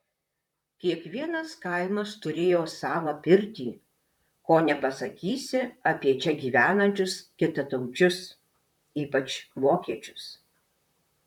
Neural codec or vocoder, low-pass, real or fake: vocoder, 44.1 kHz, 128 mel bands, Pupu-Vocoder; 19.8 kHz; fake